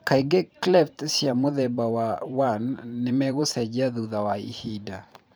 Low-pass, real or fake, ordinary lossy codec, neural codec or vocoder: none; fake; none; vocoder, 44.1 kHz, 128 mel bands every 512 samples, BigVGAN v2